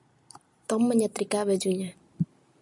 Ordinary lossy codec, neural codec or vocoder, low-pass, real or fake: MP3, 96 kbps; none; 10.8 kHz; real